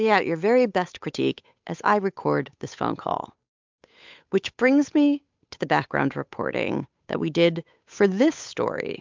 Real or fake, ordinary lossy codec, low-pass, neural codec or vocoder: fake; MP3, 64 kbps; 7.2 kHz; codec, 16 kHz, 8 kbps, FunCodec, trained on Chinese and English, 25 frames a second